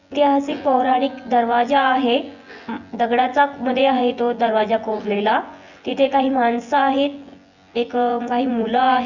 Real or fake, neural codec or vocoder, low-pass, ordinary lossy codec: fake; vocoder, 24 kHz, 100 mel bands, Vocos; 7.2 kHz; none